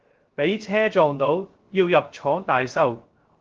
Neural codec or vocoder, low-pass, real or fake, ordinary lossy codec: codec, 16 kHz, 0.3 kbps, FocalCodec; 7.2 kHz; fake; Opus, 16 kbps